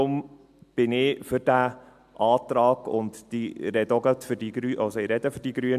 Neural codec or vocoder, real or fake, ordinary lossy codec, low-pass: none; real; MP3, 96 kbps; 14.4 kHz